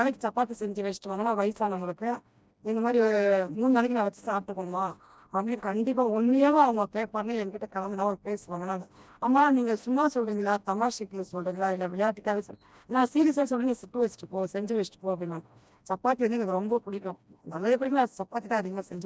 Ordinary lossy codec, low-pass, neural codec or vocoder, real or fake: none; none; codec, 16 kHz, 1 kbps, FreqCodec, smaller model; fake